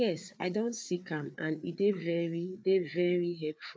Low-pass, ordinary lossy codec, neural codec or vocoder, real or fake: none; none; codec, 16 kHz, 4 kbps, FreqCodec, larger model; fake